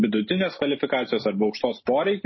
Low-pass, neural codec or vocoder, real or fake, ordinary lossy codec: 7.2 kHz; none; real; MP3, 24 kbps